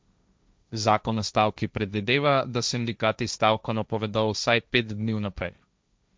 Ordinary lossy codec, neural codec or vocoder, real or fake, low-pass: none; codec, 16 kHz, 1.1 kbps, Voila-Tokenizer; fake; none